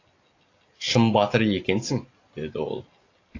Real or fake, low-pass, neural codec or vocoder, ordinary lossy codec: real; 7.2 kHz; none; AAC, 32 kbps